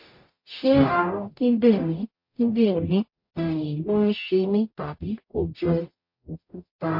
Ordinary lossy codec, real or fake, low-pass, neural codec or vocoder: MP3, 32 kbps; fake; 5.4 kHz; codec, 44.1 kHz, 0.9 kbps, DAC